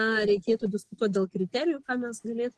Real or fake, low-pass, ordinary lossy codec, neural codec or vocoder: real; 10.8 kHz; Opus, 16 kbps; none